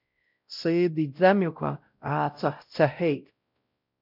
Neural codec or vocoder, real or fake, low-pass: codec, 16 kHz, 0.5 kbps, X-Codec, WavLM features, trained on Multilingual LibriSpeech; fake; 5.4 kHz